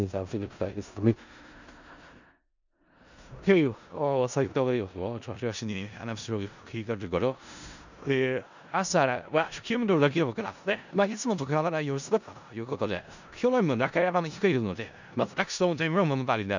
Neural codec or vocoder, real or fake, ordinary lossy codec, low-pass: codec, 16 kHz in and 24 kHz out, 0.4 kbps, LongCat-Audio-Codec, four codebook decoder; fake; none; 7.2 kHz